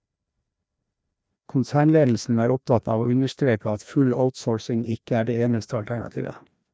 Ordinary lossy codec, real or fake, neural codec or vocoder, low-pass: none; fake; codec, 16 kHz, 1 kbps, FreqCodec, larger model; none